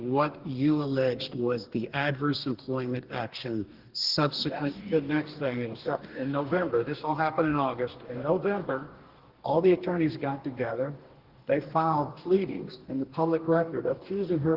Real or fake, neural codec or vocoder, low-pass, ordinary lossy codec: fake; codec, 44.1 kHz, 2.6 kbps, DAC; 5.4 kHz; Opus, 16 kbps